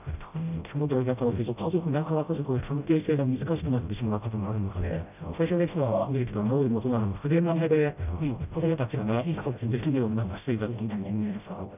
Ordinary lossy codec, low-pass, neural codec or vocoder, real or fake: none; 3.6 kHz; codec, 16 kHz, 0.5 kbps, FreqCodec, smaller model; fake